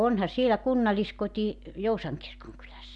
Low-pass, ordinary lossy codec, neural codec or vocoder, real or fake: 10.8 kHz; none; none; real